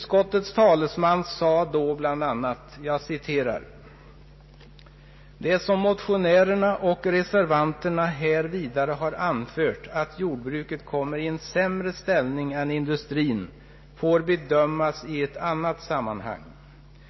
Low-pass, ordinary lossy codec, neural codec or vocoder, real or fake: 7.2 kHz; MP3, 24 kbps; none; real